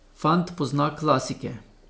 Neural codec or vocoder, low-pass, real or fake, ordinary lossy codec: none; none; real; none